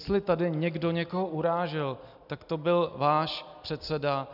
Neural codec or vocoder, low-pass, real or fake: none; 5.4 kHz; real